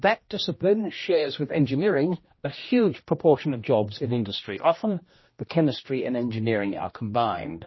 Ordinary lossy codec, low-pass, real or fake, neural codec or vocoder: MP3, 24 kbps; 7.2 kHz; fake; codec, 16 kHz, 1 kbps, X-Codec, HuBERT features, trained on general audio